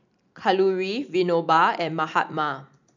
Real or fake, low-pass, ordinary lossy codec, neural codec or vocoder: real; 7.2 kHz; none; none